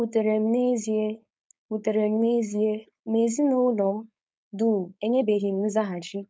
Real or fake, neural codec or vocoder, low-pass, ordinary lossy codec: fake; codec, 16 kHz, 4.8 kbps, FACodec; none; none